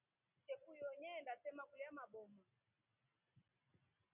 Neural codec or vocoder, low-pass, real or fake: none; 3.6 kHz; real